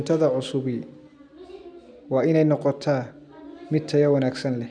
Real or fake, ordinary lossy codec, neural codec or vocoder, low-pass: real; none; none; 9.9 kHz